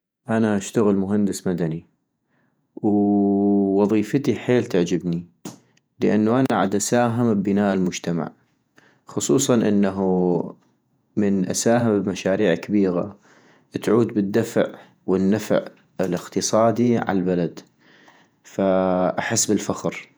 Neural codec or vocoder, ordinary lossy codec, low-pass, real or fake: vocoder, 48 kHz, 128 mel bands, Vocos; none; none; fake